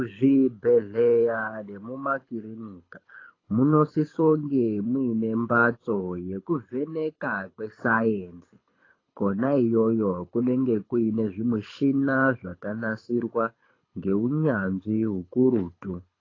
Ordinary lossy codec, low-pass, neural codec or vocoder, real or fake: AAC, 32 kbps; 7.2 kHz; codec, 24 kHz, 6 kbps, HILCodec; fake